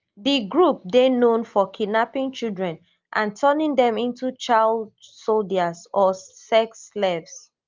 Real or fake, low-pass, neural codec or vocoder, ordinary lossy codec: real; 7.2 kHz; none; Opus, 24 kbps